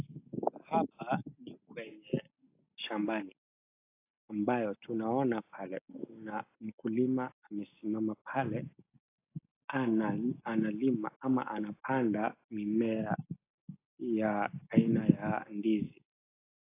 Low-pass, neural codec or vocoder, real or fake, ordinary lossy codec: 3.6 kHz; none; real; AAC, 24 kbps